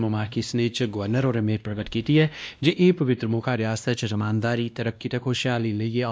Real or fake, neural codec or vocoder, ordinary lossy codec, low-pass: fake; codec, 16 kHz, 1 kbps, X-Codec, WavLM features, trained on Multilingual LibriSpeech; none; none